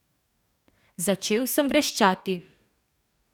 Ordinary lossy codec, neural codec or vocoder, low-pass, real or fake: none; codec, 44.1 kHz, 2.6 kbps, DAC; 19.8 kHz; fake